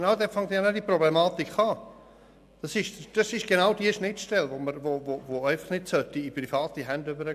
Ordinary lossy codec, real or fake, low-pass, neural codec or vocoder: AAC, 96 kbps; real; 14.4 kHz; none